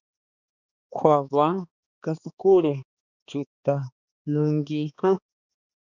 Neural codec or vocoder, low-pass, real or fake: codec, 16 kHz, 2 kbps, X-Codec, HuBERT features, trained on balanced general audio; 7.2 kHz; fake